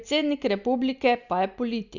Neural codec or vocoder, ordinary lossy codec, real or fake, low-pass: none; none; real; 7.2 kHz